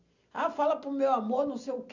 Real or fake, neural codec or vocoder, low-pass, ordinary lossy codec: real; none; 7.2 kHz; none